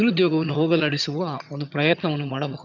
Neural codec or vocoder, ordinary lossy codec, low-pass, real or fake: vocoder, 22.05 kHz, 80 mel bands, HiFi-GAN; none; 7.2 kHz; fake